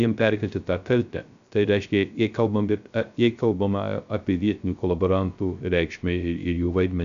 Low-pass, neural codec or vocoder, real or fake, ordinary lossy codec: 7.2 kHz; codec, 16 kHz, 0.3 kbps, FocalCodec; fake; AAC, 96 kbps